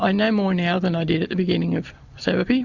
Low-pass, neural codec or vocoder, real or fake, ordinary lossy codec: 7.2 kHz; none; real; Opus, 64 kbps